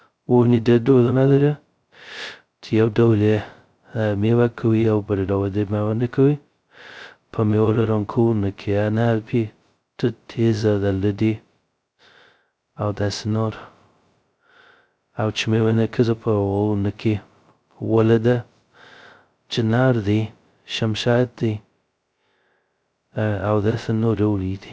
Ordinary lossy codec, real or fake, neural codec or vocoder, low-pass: none; fake; codec, 16 kHz, 0.2 kbps, FocalCodec; none